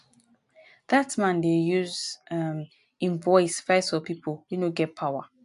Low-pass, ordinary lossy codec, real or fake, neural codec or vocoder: 10.8 kHz; AAC, 64 kbps; real; none